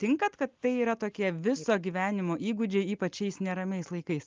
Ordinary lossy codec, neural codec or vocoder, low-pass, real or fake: Opus, 24 kbps; none; 7.2 kHz; real